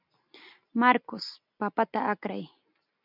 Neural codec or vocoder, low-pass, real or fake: none; 5.4 kHz; real